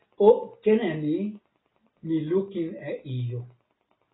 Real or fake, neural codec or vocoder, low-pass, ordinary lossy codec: real; none; 7.2 kHz; AAC, 16 kbps